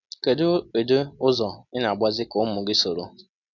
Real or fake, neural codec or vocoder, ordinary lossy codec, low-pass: real; none; none; 7.2 kHz